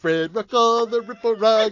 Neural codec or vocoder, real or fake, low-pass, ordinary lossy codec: none; real; 7.2 kHz; AAC, 48 kbps